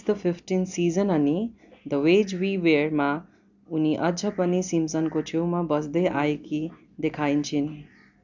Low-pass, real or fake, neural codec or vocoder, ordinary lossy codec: 7.2 kHz; real; none; none